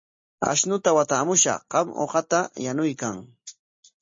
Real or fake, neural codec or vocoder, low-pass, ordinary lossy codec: real; none; 7.2 kHz; MP3, 32 kbps